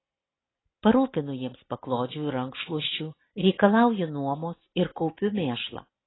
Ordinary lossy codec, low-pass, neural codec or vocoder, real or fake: AAC, 16 kbps; 7.2 kHz; none; real